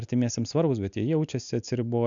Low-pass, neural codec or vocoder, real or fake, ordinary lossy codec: 7.2 kHz; none; real; MP3, 96 kbps